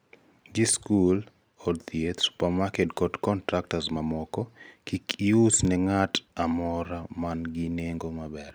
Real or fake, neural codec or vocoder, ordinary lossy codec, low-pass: real; none; none; none